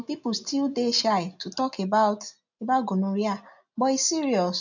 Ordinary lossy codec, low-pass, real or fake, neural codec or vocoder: none; 7.2 kHz; real; none